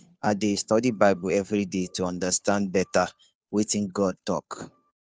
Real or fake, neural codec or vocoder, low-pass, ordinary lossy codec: fake; codec, 16 kHz, 2 kbps, FunCodec, trained on Chinese and English, 25 frames a second; none; none